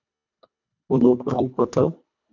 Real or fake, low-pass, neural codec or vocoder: fake; 7.2 kHz; codec, 24 kHz, 1.5 kbps, HILCodec